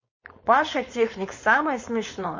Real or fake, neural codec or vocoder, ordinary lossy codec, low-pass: fake; codec, 16 kHz, 4.8 kbps, FACodec; MP3, 32 kbps; 7.2 kHz